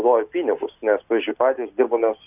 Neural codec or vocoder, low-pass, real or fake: none; 3.6 kHz; real